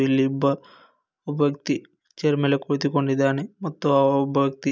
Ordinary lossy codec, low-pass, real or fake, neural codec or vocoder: none; none; real; none